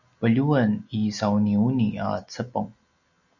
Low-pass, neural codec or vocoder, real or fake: 7.2 kHz; none; real